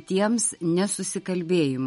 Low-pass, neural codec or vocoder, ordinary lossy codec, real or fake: 19.8 kHz; none; MP3, 48 kbps; real